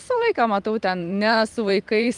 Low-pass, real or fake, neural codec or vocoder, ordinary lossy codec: 10.8 kHz; fake; vocoder, 44.1 kHz, 128 mel bands every 512 samples, BigVGAN v2; Opus, 32 kbps